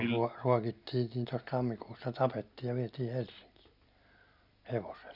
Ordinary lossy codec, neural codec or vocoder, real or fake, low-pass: none; none; real; 5.4 kHz